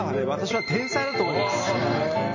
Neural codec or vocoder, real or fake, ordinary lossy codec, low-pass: none; real; none; 7.2 kHz